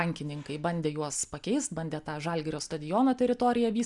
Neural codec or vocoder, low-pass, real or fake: none; 10.8 kHz; real